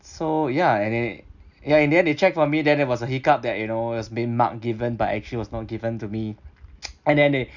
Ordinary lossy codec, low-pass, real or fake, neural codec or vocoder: none; 7.2 kHz; real; none